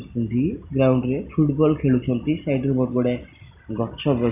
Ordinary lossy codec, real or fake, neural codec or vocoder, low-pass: none; real; none; 3.6 kHz